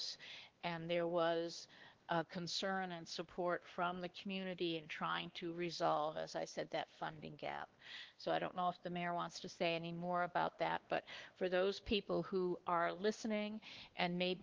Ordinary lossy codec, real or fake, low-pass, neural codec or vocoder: Opus, 16 kbps; fake; 7.2 kHz; codec, 16 kHz, 2 kbps, X-Codec, WavLM features, trained on Multilingual LibriSpeech